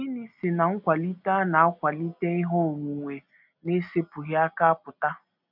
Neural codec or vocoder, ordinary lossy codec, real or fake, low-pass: none; none; real; 5.4 kHz